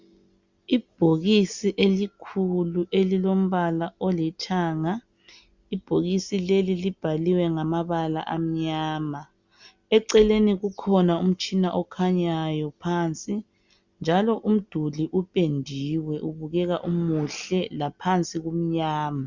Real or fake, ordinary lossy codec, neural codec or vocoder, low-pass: real; Opus, 64 kbps; none; 7.2 kHz